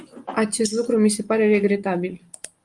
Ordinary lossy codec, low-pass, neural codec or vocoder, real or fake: Opus, 32 kbps; 10.8 kHz; none; real